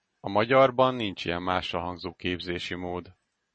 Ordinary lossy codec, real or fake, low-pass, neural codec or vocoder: MP3, 32 kbps; real; 9.9 kHz; none